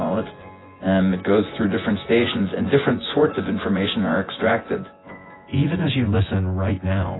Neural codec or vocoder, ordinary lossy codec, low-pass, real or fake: vocoder, 24 kHz, 100 mel bands, Vocos; AAC, 16 kbps; 7.2 kHz; fake